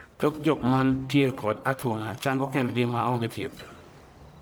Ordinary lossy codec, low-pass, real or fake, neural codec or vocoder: none; none; fake; codec, 44.1 kHz, 1.7 kbps, Pupu-Codec